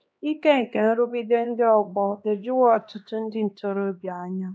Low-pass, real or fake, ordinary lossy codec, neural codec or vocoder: none; fake; none; codec, 16 kHz, 2 kbps, X-Codec, HuBERT features, trained on LibriSpeech